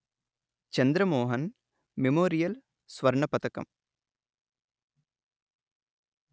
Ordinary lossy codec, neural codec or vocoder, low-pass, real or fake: none; none; none; real